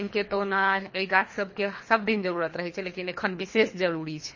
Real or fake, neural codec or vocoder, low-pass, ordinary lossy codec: fake; codec, 24 kHz, 3 kbps, HILCodec; 7.2 kHz; MP3, 32 kbps